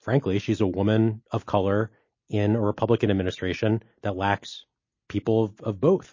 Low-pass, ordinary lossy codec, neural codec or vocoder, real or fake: 7.2 kHz; MP3, 32 kbps; none; real